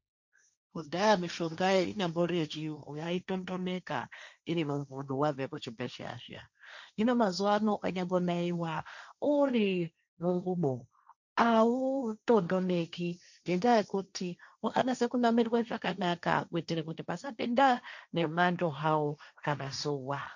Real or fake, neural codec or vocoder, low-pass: fake; codec, 16 kHz, 1.1 kbps, Voila-Tokenizer; 7.2 kHz